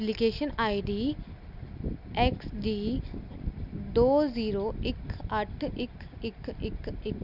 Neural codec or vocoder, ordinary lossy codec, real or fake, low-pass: none; none; real; 5.4 kHz